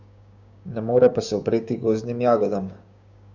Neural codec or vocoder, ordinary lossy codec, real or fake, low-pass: codec, 16 kHz, 6 kbps, DAC; none; fake; 7.2 kHz